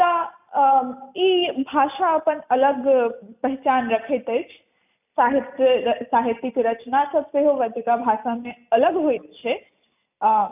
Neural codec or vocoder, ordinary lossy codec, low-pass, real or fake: none; none; 3.6 kHz; real